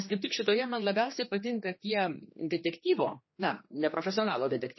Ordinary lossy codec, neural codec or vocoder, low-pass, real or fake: MP3, 24 kbps; codec, 16 kHz, 2 kbps, X-Codec, HuBERT features, trained on general audio; 7.2 kHz; fake